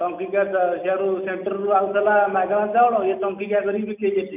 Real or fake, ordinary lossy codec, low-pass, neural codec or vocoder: real; none; 3.6 kHz; none